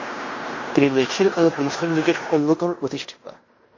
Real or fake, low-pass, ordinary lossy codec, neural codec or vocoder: fake; 7.2 kHz; MP3, 32 kbps; codec, 16 kHz in and 24 kHz out, 0.9 kbps, LongCat-Audio-Codec, fine tuned four codebook decoder